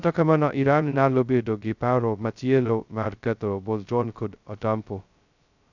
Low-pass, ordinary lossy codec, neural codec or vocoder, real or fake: 7.2 kHz; none; codec, 16 kHz, 0.2 kbps, FocalCodec; fake